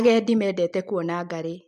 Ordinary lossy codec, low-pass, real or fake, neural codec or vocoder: MP3, 96 kbps; 14.4 kHz; real; none